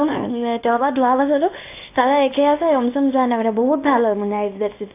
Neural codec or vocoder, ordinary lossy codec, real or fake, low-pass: codec, 24 kHz, 0.9 kbps, WavTokenizer, small release; AAC, 24 kbps; fake; 3.6 kHz